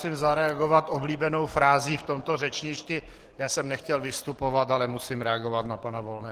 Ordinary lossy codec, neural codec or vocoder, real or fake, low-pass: Opus, 16 kbps; codec, 44.1 kHz, 7.8 kbps, DAC; fake; 14.4 kHz